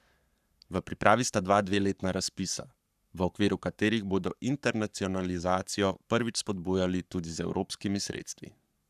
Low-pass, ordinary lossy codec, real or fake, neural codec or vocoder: 14.4 kHz; none; fake; codec, 44.1 kHz, 7.8 kbps, Pupu-Codec